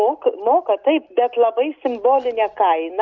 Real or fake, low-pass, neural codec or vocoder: real; 7.2 kHz; none